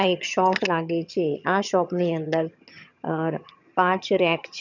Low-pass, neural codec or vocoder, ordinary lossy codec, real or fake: 7.2 kHz; vocoder, 22.05 kHz, 80 mel bands, HiFi-GAN; none; fake